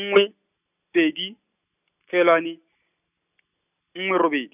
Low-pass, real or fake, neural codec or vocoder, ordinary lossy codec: 3.6 kHz; real; none; none